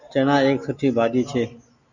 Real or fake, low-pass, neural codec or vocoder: real; 7.2 kHz; none